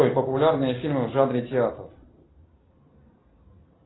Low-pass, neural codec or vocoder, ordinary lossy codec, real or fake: 7.2 kHz; none; AAC, 16 kbps; real